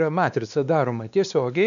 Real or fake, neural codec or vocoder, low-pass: fake; codec, 16 kHz, 2 kbps, X-Codec, WavLM features, trained on Multilingual LibriSpeech; 7.2 kHz